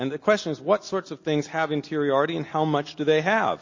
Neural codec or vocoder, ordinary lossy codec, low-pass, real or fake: none; MP3, 32 kbps; 7.2 kHz; real